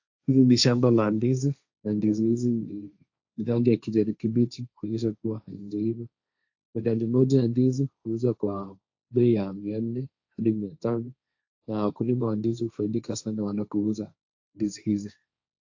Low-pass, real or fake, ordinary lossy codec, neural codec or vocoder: 7.2 kHz; fake; AAC, 48 kbps; codec, 16 kHz, 1.1 kbps, Voila-Tokenizer